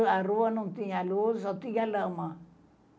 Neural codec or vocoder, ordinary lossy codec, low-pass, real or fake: none; none; none; real